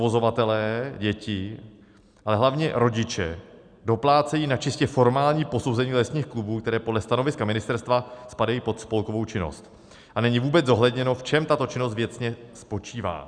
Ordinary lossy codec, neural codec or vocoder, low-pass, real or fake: Opus, 64 kbps; none; 9.9 kHz; real